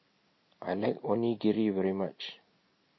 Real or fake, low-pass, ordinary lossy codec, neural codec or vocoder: real; 7.2 kHz; MP3, 24 kbps; none